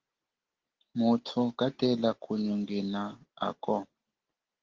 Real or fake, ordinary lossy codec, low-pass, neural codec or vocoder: real; Opus, 16 kbps; 7.2 kHz; none